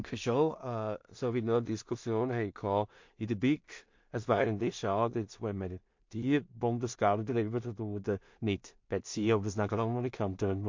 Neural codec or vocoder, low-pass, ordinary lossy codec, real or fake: codec, 16 kHz in and 24 kHz out, 0.4 kbps, LongCat-Audio-Codec, two codebook decoder; 7.2 kHz; MP3, 48 kbps; fake